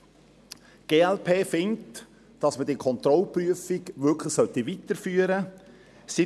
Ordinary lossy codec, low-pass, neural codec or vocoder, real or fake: none; none; none; real